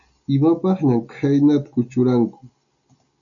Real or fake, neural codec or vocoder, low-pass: real; none; 7.2 kHz